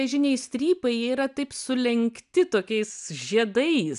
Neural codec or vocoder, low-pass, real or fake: none; 10.8 kHz; real